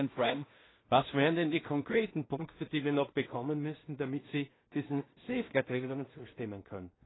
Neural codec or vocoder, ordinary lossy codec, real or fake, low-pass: codec, 16 kHz in and 24 kHz out, 0.4 kbps, LongCat-Audio-Codec, two codebook decoder; AAC, 16 kbps; fake; 7.2 kHz